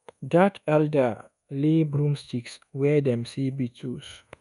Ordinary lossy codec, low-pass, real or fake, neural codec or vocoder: none; 10.8 kHz; fake; codec, 24 kHz, 1.2 kbps, DualCodec